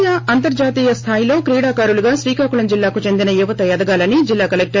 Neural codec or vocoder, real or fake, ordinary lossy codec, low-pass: none; real; MP3, 32 kbps; 7.2 kHz